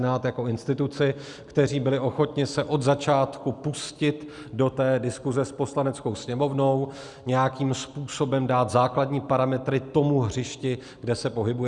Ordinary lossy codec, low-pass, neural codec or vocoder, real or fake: Opus, 64 kbps; 10.8 kHz; none; real